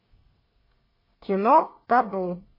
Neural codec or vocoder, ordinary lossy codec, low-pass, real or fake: codec, 24 kHz, 1 kbps, SNAC; MP3, 24 kbps; 5.4 kHz; fake